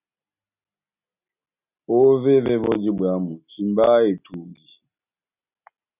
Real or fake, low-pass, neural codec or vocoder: real; 3.6 kHz; none